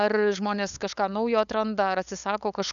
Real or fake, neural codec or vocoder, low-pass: fake; codec, 16 kHz, 8 kbps, FunCodec, trained on LibriTTS, 25 frames a second; 7.2 kHz